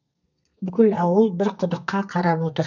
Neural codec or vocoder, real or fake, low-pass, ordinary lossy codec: codec, 32 kHz, 1.9 kbps, SNAC; fake; 7.2 kHz; none